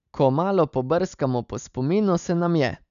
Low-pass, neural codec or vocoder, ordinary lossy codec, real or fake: 7.2 kHz; none; none; real